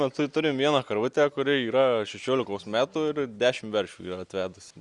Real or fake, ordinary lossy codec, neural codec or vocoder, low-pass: real; Opus, 64 kbps; none; 10.8 kHz